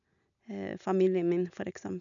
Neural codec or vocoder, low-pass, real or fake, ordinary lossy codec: none; 7.2 kHz; real; none